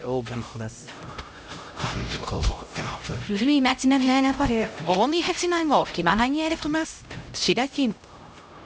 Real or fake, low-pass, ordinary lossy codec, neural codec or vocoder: fake; none; none; codec, 16 kHz, 0.5 kbps, X-Codec, HuBERT features, trained on LibriSpeech